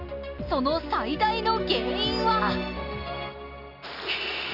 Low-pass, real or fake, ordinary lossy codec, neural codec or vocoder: 5.4 kHz; real; none; none